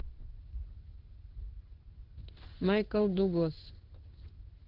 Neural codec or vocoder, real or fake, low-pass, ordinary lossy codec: codec, 16 kHz in and 24 kHz out, 1 kbps, XY-Tokenizer; fake; 5.4 kHz; Opus, 16 kbps